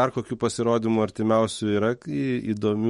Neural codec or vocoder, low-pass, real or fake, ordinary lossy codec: autoencoder, 48 kHz, 128 numbers a frame, DAC-VAE, trained on Japanese speech; 19.8 kHz; fake; MP3, 48 kbps